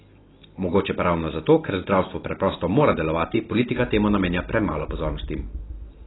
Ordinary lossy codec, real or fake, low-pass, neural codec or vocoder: AAC, 16 kbps; real; 7.2 kHz; none